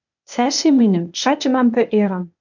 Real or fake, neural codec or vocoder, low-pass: fake; codec, 16 kHz, 0.8 kbps, ZipCodec; 7.2 kHz